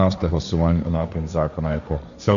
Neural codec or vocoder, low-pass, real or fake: codec, 16 kHz, 1.1 kbps, Voila-Tokenizer; 7.2 kHz; fake